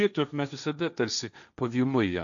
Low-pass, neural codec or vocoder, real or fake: 7.2 kHz; codec, 16 kHz, 1.1 kbps, Voila-Tokenizer; fake